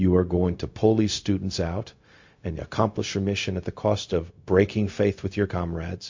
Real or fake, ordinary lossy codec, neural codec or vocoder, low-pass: fake; MP3, 48 kbps; codec, 16 kHz, 0.4 kbps, LongCat-Audio-Codec; 7.2 kHz